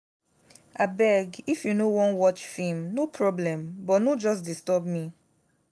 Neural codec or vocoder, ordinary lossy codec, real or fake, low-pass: none; none; real; none